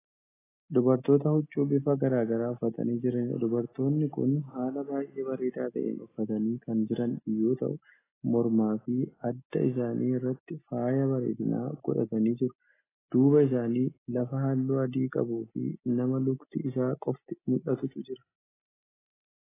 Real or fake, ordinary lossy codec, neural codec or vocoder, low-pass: real; AAC, 16 kbps; none; 3.6 kHz